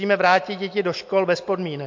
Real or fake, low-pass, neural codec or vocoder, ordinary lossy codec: real; 7.2 kHz; none; MP3, 48 kbps